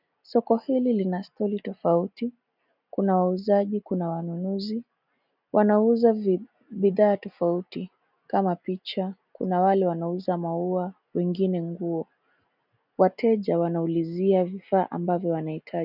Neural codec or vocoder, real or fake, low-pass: none; real; 5.4 kHz